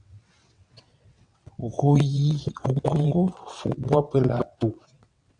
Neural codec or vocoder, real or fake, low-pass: vocoder, 22.05 kHz, 80 mel bands, WaveNeXt; fake; 9.9 kHz